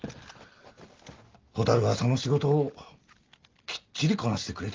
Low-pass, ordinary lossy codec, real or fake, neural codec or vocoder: 7.2 kHz; Opus, 32 kbps; real; none